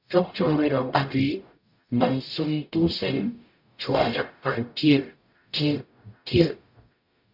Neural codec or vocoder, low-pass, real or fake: codec, 44.1 kHz, 0.9 kbps, DAC; 5.4 kHz; fake